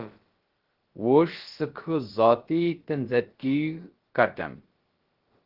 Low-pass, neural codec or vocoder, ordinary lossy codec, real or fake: 5.4 kHz; codec, 16 kHz, about 1 kbps, DyCAST, with the encoder's durations; Opus, 16 kbps; fake